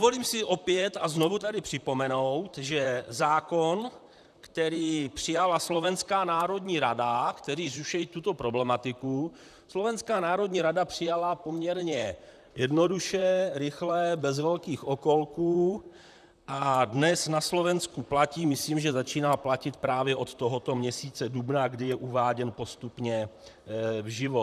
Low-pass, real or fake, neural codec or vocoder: 14.4 kHz; fake; vocoder, 44.1 kHz, 128 mel bands, Pupu-Vocoder